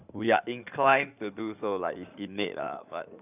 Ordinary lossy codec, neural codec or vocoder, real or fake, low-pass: none; codec, 16 kHz in and 24 kHz out, 2.2 kbps, FireRedTTS-2 codec; fake; 3.6 kHz